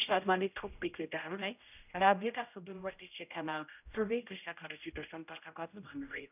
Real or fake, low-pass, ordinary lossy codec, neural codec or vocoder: fake; 3.6 kHz; none; codec, 16 kHz, 0.5 kbps, X-Codec, HuBERT features, trained on general audio